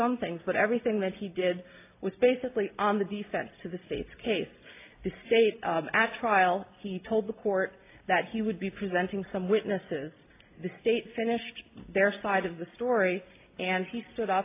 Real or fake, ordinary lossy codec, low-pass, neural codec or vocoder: real; AAC, 24 kbps; 3.6 kHz; none